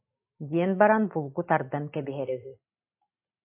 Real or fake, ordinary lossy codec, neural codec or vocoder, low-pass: real; AAC, 24 kbps; none; 3.6 kHz